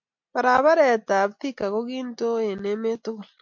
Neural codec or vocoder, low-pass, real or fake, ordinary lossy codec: none; 7.2 kHz; real; MP3, 48 kbps